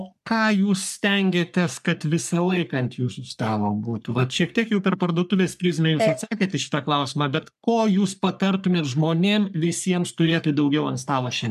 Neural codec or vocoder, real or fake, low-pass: codec, 44.1 kHz, 3.4 kbps, Pupu-Codec; fake; 14.4 kHz